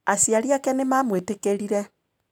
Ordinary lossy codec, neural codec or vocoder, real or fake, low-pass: none; none; real; none